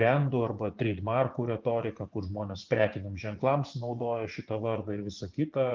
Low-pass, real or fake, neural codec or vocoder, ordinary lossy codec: 7.2 kHz; fake; vocoder, 24 kHz, 100 mel bands, Vocos; Opus, 24 kbps